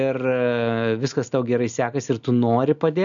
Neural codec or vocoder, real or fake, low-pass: none; real; 7.2 kHz